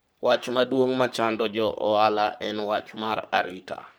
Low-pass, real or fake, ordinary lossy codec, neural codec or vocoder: none; fake; none; codec, 44.1 kHz, 3.4 kbps, Pupu-Codec